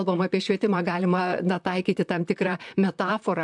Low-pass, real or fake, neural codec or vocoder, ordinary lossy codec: 10.8 kHz; fake; vocoder, 44.1 kHz, 128 mel bands, Pupu-Vocoder; MP3, 96 kbps